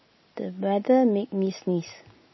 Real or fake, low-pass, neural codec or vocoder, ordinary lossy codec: real; 7.2 kHz; none; MP3, 24 kbps